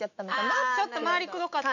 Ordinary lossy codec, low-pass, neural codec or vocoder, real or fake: none; 7.2 kHz; autoencoder, 48 kHz, 128 numbers a frame, DAC-VAE, trained on Japanese speech; fake